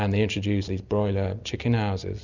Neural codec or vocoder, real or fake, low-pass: none; real; 7.2 kHz